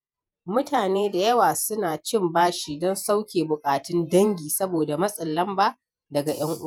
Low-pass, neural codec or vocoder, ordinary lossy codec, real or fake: none; vocoder, 48 kHz, 128 mel bands, Vocos; none; fake